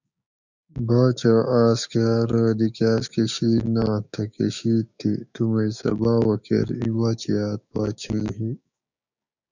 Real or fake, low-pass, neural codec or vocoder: fake; 7.2 kHz; codec, 16 kHz, 6 kbps, DAC